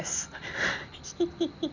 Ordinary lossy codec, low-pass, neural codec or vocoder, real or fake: none; 7.2 kHz; none; real